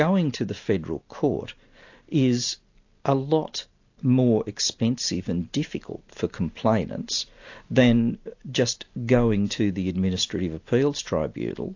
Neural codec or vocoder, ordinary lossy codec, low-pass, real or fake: none; AAC, 48 kbps; 7.2 kHz; real